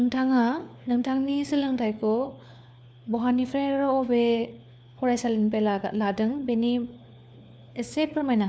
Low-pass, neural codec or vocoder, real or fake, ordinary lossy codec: none; codec, 16 kHz, 4 kbps, FunCodec, trained on LibriTTS, 50 frames a second; fake; none